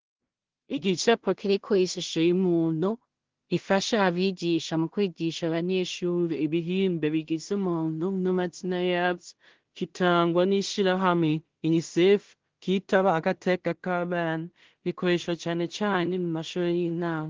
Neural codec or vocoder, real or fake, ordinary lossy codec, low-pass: codec, 16 kHz in and 24 kHz out, 0.4 kbps, LongCat-Audio-Codec, two codebook decoder; fake; Opus, 16 kbps; 7.2 kHz